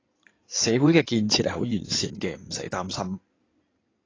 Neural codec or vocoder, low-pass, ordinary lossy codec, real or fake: codec, 16 kHz in and 24 kHz out, 2.2 kbps, FireRedTTS-2 codec; 7.2 kHz; AAC, 32 kbps; fake